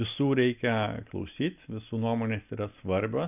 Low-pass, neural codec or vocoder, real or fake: 3.6 kHz; none; real